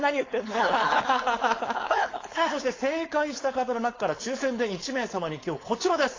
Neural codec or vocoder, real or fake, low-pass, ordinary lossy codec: codec, 16 kHz, 4.8 kbps, FACodec; fake; 7.2 kHz; AAC, 32 kbps